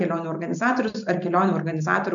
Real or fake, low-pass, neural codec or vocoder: real; 7.2 kHz; none